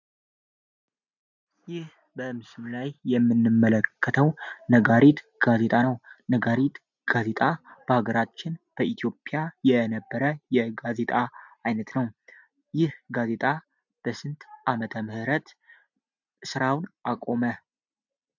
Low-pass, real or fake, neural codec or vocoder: 7.2 kHz; real; none